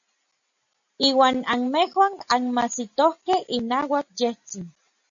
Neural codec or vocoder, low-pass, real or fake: none; 7.2 kHz; real